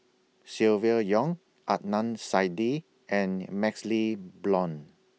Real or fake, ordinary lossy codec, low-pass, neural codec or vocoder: real; none; none; none